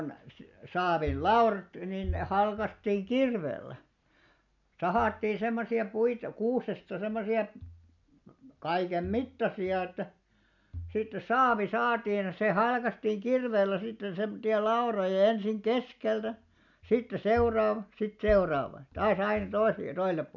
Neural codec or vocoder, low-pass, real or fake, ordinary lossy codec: none; 7.2 kHz; real; none